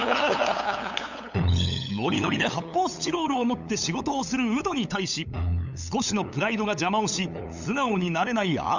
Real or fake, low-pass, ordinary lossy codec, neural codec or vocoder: fake; 7.2 kHz; none; codec, 16 kHz, 8 kbps, FunCodec, trained on LibriTTS, 25 frames a second